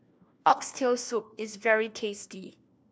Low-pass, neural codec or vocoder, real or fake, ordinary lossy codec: none; codec, 16 kHz, 1 kbps, FunCodec, trained on LibriTTS, 50 frames a second; fake; none